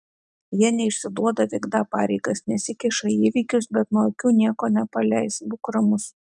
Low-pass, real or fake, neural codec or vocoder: 10.8 kHz; real; none